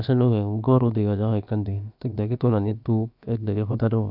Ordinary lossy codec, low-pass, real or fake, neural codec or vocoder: none; 5.4 kHz; fake; codec, 16 kHz, about 1 kbps, DyCAST, with the encoder's durations